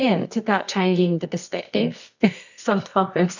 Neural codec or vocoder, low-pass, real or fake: codec, 24 kHz, 0.9 kbps, WavTokenizer, medium music audio release; 7.2 kHz; fake